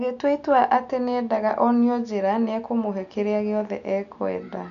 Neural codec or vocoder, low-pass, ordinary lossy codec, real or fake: none; 7.2 kHz; none; real